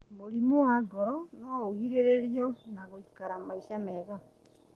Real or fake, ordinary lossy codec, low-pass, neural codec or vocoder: fake; Opus, 24 kbps; 19.8 kHz; codec, 44.1 kHz, 7.8 kbps, Pupu-Codec